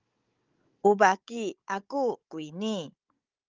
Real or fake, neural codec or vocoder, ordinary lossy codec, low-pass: fake; codec, 16 kHz, 16 kbps, FunCodec, trained on Chinese and English, 50 frames a second; Opus, 32 kbps; 7.2 kHz